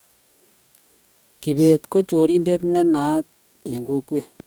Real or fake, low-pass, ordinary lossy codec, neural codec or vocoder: fake; none; none; codec, 44.1 kHz, 2.6 kbps, DAC